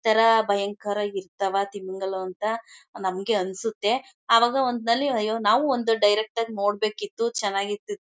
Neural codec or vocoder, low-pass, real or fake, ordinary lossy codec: none; none; real; none